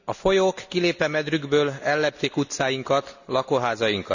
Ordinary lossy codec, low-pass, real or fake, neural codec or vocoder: none; 7.2 kHz; real; none